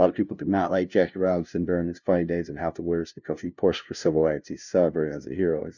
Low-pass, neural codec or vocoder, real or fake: 7.2 kHz; codec, 16 kHz, 0.5 kbps, FunCodec, trained on LibriTTS, 25 frames a second; fake